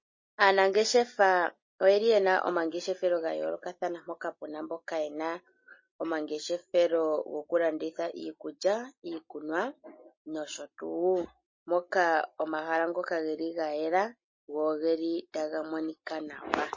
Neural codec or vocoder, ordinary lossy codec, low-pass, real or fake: none; MP3, 32 kbps; 7.2 kHz; real